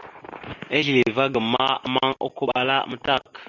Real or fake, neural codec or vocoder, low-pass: real; none; 7.2 kHz